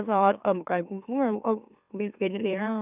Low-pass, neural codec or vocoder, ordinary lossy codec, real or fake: 3.6 kHz; autoencoder, 44.1 kHz, a latent of 192 numbers a frame, MeloTTS; none; fake